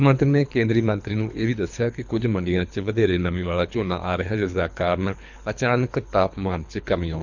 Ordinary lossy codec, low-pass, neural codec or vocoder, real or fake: none; 7.2 kHz; codec, 24 kHz, 3 kbps, HILCodec; fake